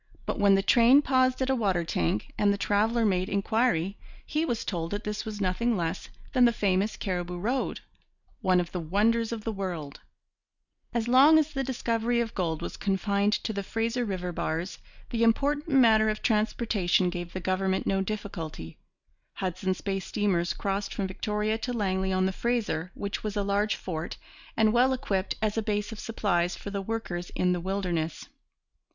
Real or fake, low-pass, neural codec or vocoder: real; 7.2 kHz; none